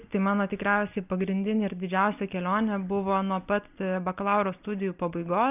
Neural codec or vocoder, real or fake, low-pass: none; real; 3.6 kHz